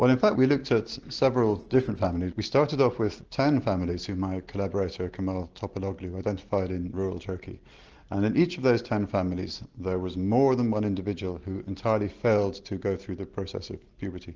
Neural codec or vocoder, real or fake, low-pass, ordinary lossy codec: none; real; 7.2 kHz; Opus, 16 kbps